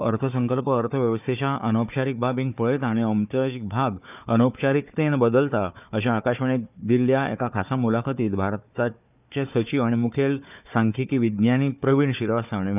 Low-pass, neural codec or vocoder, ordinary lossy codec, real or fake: 3.6 kHz; codec, 16 kHz, 4 kbps, FunCodec, trained on Chinese and English, 50 frames a second; none; fake